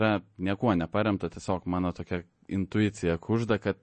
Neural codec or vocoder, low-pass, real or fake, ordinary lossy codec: none; 10.8 kHz; real; MP3, 32 kbps